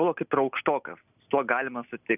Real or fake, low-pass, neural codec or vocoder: real; 3.6 kHz; none